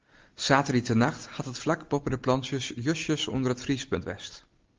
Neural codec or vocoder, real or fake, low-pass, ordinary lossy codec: none; real; 7.2 kHz; Opus, 32 kbps